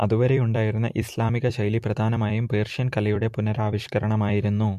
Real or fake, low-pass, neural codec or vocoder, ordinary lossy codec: fake; 14.4 kHz; vocoder, 44.1 kHz, 128 mel bands every 256 samples, BigVGAN v2; MP3, 64 kbps